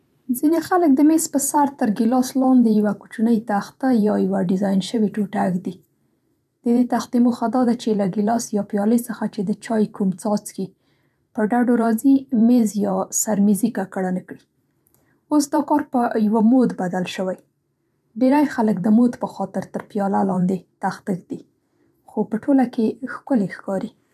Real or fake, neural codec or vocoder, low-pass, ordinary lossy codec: fake; vocoder, 44.1 kHz, 128 mel bands every 512 samples, BigVGAN v2; 14.4 kHz; none